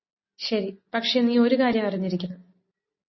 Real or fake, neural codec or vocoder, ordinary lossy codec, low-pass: real; none; MP3, 24 kbps; 7.2 kHz